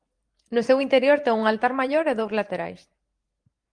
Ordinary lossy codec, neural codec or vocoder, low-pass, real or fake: Opus, 24 kbps; vocoder, 44.1 kHz, 128 mel bands every 512 samples, BigVGAN v2; 9.9 kHz; fake